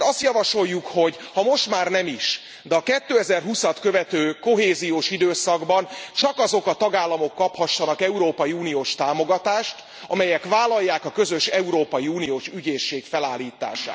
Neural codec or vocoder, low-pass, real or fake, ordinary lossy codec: none; none; real; none